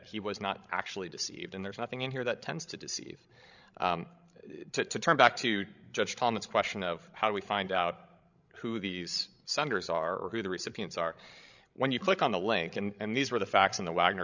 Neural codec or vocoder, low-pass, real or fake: codec, 16 kHz, 16 kbps, FreqCodec, larger model; 7.2 kHz; fake